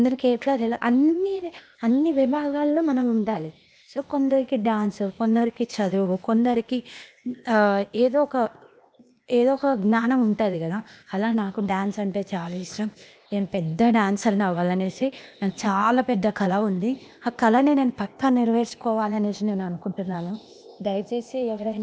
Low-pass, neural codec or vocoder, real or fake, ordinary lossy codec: none; codec, 16 kHz, 0.8 kbps, ZipCodec; fake; none